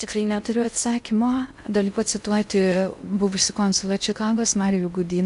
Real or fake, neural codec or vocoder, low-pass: fake; codec, 16 kHz in and 24 kHz out, 0.6 kbps, FocalCodec, streaming, 2048 codes; 10.8 kHz